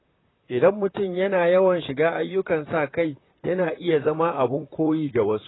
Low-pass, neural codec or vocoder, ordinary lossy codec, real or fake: 7.2 kHz; vocoder, 44.1 kHz, 128 mel bands, Pupu-Vocoder; AAC, 16 kbps; fake